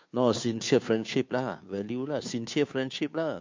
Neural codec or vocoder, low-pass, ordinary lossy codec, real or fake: codec, 16 kHz, 2 kbps, FunCodec, trained on Chinese and English, 25 frames a second; 7.2 kHz; MP3, 48 kbps; fake